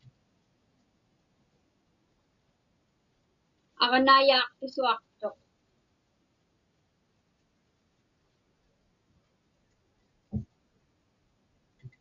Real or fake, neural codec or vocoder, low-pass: real; none; 7.2 kHz